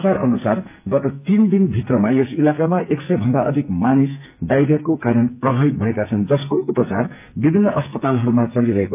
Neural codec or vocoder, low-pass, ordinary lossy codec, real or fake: codec, 44.1 kHz, 2.6 kbps, SNAC; 3.6 kHz; AAC, 32 kbps; fake